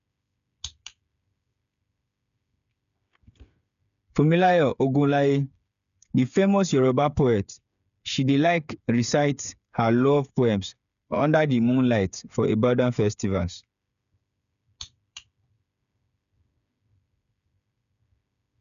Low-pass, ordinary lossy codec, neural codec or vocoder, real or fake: 7.2 kHz; none; codec, 16 kHz, 8 kbps, FreqCodec, smaller model; fake